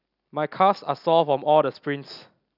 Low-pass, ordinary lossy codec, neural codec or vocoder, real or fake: 5.4 kHz; none; none; real